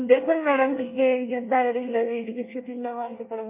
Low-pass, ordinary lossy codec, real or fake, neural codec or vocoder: 3.6 kHz; MP3, 24 kbps; fake; codec, 24 kHz, 1 kbps, SNAC